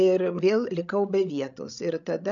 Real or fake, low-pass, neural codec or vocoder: fake; 7.2 kHz; codec, 16 kHz, 16 kbps, FreqCodec, larger model